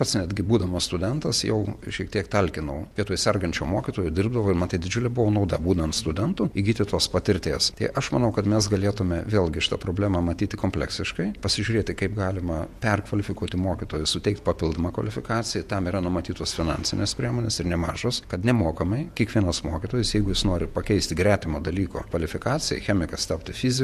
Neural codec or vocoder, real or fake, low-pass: vocoder, 48 kHz, 128 mel bands, Vocos; fake; 14.4 kHz